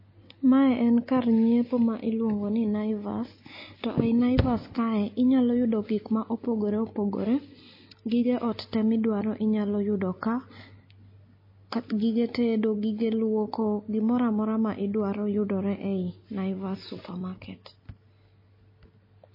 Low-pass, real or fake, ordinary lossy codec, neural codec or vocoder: 5.4 kHz; real; MP3, 24 kbps; none